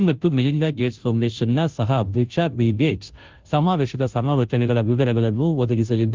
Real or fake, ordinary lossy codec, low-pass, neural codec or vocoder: fake; Opus, 32 kbps; 7.2 kHz; codec, 16 kHz, 0.5 kbps, FunCodec, trained on Chinese and English, 25 frames a second